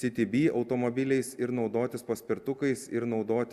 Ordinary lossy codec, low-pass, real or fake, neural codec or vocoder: Opus, 64 kbps; 14.4 kHz; real; none